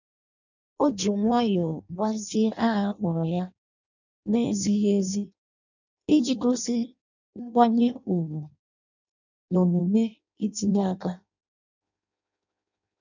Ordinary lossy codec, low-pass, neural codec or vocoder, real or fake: none; 7.2 kHz; codec, 16 kHz in and 24 kHz out, 0.6 kbps, FireRedTTS-2 codec; fake